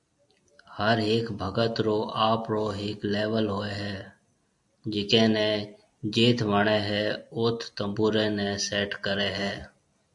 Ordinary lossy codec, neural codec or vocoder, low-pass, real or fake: AAC, 64 kbps; none; 10.8 kHz; real